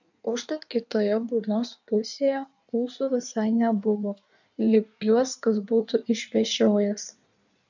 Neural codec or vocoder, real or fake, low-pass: codec, 16 kHz in and 24 kHz out, 1.1 kbps, FireRedTTS-2 codec; fake; 7.2 kHz